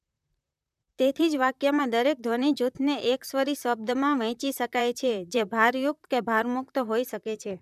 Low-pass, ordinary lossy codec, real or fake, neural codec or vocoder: 14.4 kHz; none; fake; vocoder, 44.1 kHz, 128 mel bands, Pupu-Vocoder